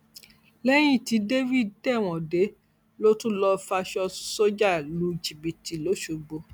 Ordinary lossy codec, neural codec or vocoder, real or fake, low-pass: none; none; real; none